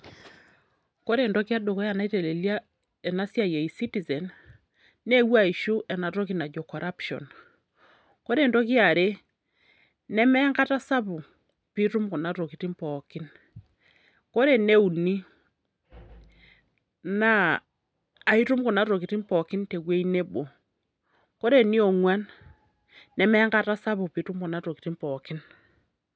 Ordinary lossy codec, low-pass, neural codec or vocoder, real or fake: none; none; none; real